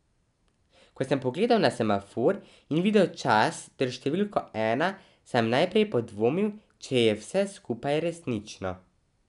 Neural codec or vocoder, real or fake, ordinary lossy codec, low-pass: none; real; none; 10.8 kHz